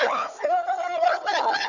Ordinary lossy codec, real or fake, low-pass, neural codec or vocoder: none; fake; 7.2 kHz; codec, 16 kHz, 4 kbps, FunCodec, trained on Chinese and English, 50 frames a second